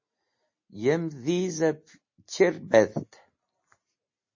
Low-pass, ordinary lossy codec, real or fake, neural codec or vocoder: 7.2 kHz; MP3, 32 kbps; real; none